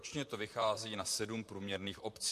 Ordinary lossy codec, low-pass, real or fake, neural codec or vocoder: MP3, 64 kbps; 14.4 kHz; fake; vocoder, 44.1 kHz, 128 mel bands, Pupu-Vocoder